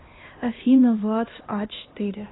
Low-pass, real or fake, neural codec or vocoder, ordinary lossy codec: 7.2 kHz; fake; codec, 16 kHz, 1 kbps, X-Codec, HuBERT features, trained on LibriSpeech; AAC, 16 kbps